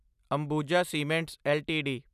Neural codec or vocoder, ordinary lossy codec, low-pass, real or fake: none; none; 14.4 kHz; real